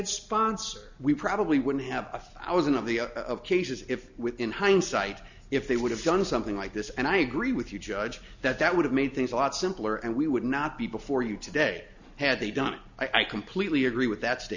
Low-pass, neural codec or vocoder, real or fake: 7.2 kHz; none; real